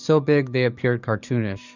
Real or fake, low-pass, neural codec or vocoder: fake; 7.2 kHz; codec, 44.1 kHz, 7.8 kbps, DAC